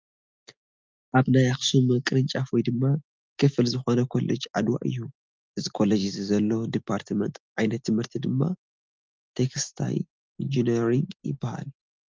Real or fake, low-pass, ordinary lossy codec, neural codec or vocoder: real; 7.2 kHz; Opus, 32 kbps; none